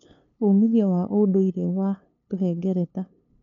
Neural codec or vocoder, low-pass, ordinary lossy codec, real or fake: codec, 16 kHz, 2 kbps, FunCodec, trained on LibriTTS, 25 frames a second; 7.2 kHz; none; fake